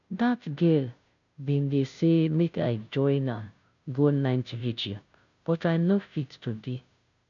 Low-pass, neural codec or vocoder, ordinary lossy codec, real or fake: 7.2 kHz; codec, 16 kHz, 0.5 kbps, FunCodec, trained on Chinese and English, 25 frames a second; none; fake